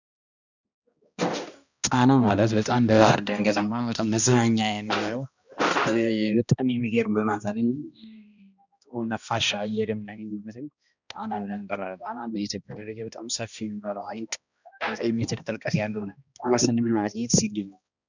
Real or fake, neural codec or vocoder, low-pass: fake; codec, 16 kHz, 1 kbps, X-Codec, HuBERT features, trained on balanced general audio; 7.2 kHz